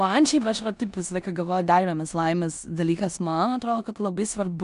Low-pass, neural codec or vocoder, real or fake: 10.8 kHz; codec, 16 kHz in and 24 kHz out, 0.9 kbps, LongCat-Audio-Codec, four codebook decoder; fake